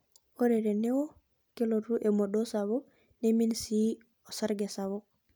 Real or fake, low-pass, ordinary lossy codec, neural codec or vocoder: real; none; none; none